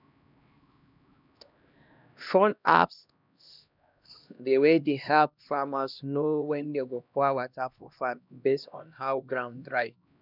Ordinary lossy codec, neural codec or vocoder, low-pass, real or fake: none; codec, 16 kHz, 1 kbps, X-Codec, HuBERT features, trained on LibriSpeech; 5.4 kHz; fake